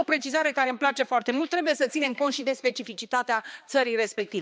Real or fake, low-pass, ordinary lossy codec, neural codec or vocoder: fake; none; none; codec, 16 kHz, 2 kbps, X-Codec, HuBERT features, trained on balanced general audio